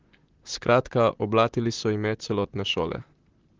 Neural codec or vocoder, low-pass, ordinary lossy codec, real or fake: vocoder, 44.1 kHz, 128 mel bands, Pupu-Vocoder; 7.2 kHz; Opus, 16 kbps; fake